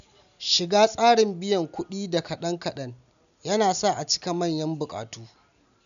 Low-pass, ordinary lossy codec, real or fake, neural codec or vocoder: 7.2 kHz; none; real; none